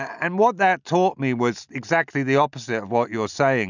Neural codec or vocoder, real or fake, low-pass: none; real; 7.2 kHz